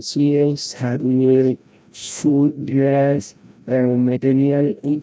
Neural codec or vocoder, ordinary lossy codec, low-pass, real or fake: codec, 16 kHz, 0.5 kbps, FreqCodec, larger model; none; none; fake